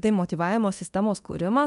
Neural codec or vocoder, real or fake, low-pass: codec, 24 kHz, 0.9 kbps, DualCodec; fake; 10.8 kHz